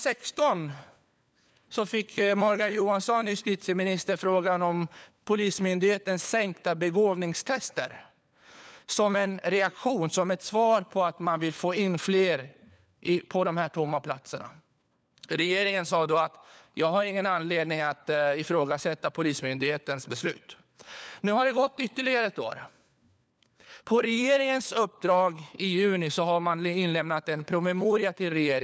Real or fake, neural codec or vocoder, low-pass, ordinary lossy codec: fake; codec, 16 kHz, 4 kbps, FunCodec, trained on LibriTTS, 50 frames a second; none; none